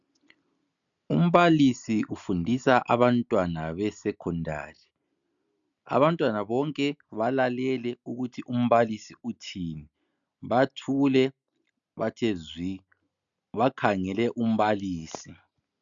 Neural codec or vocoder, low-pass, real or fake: none; 7.2 kHz; real